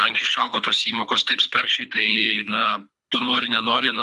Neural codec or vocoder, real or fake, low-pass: codec, 24 kHz, 3 kbps, HILCodec; fake; 10.8 kHz